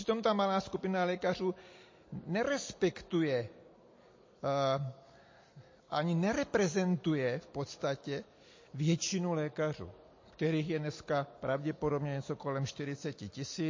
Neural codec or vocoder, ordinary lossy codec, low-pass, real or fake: none; MP3, 32 kbps; 7.2 kHz; real